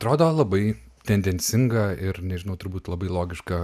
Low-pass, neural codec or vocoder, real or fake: 14.4 kHz; none; real